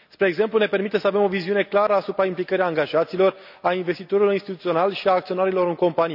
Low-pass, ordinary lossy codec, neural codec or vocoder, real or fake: 5.4 kHz; none; none; real